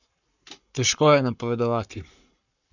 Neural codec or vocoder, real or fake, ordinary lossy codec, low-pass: codec, 44.1 kHz, 7.8 kbps, Pupu-Codec; fake; none; 7.2 kHz